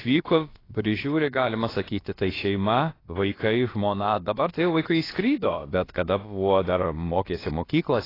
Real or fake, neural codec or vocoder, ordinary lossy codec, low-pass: fake; codec, 16 kHz, about 1 kbps, DyCAST, with the encoder's durations; AAC, 24 kbps; 5.4 kHz